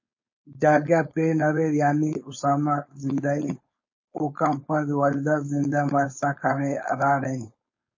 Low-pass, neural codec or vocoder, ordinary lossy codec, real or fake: 7.2 kHz; codec, 16 kHz, 4.8 kbps, FACodec; MP3, 32 kbps; fake